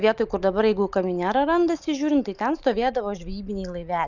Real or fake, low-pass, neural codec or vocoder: real; 7.2 kHz; none